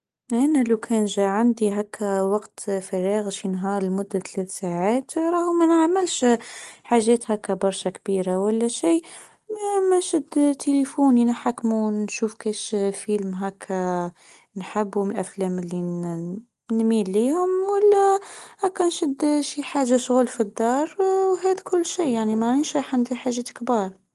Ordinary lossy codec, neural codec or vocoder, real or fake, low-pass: Opus, 24 kbps; none; real; 10.8 kHz